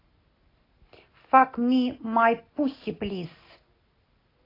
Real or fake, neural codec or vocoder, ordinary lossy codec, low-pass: real; none; AAC, 24 kbps; 5.4 kHz